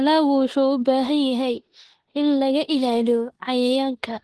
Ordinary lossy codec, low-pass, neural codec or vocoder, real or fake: Opus, 16 kbps; 10.8 kHz; codec, 16 kHz in and 24 kHz out, 0.9 kbps, LongCat-Audio-Codec, four codebook decoder; fake